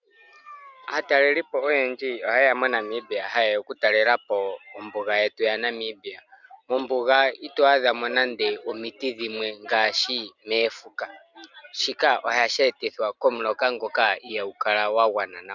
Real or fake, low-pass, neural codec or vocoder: real; 7.2 kHz; none